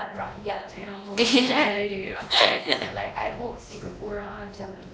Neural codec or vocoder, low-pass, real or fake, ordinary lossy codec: codec, 16 kHz, 1 kbps, X-Codec, WavLM features, trained on Multilingual LibriSpeech; none; fake; none